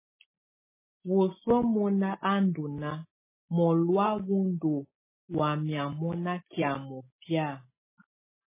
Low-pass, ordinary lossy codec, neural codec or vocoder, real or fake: 3.6 kHz; MP3, 16 kbps; none; real